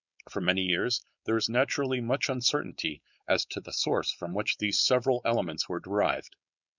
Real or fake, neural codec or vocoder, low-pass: fake; codec, 16 kHz, 4.8 kbps, FACodec; 7.2 kHz